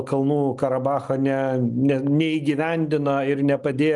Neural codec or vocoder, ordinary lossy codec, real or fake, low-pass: none; Opus, 32 kbps; real; 10.8 kHz